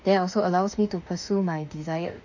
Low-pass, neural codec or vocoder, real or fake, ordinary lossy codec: 7.2 kHz; autoencoder, 48 kHz, 32 numbers a frame, DAC-VAE, trained on Japanese speech; fake; none